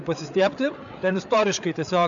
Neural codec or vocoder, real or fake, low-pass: codec, 16 kHz, 8 kbps, FreqCodec, larger model; fake; 7.2 kHz